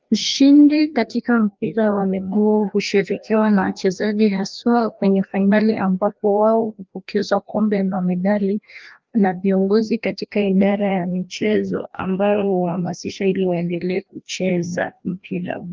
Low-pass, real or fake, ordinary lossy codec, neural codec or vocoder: 7.2 kHz; fake; Opus, 32 kbps; codec, 16 kHz, 1 kbps, FreqCodec, larger model